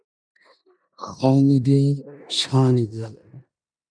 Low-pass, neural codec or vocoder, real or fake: 9.9 kHz; codec, 16 kHz in and 24 kHz out, 0.9 kbps, LongCat-Audio-Codec, four codebook decoder; fake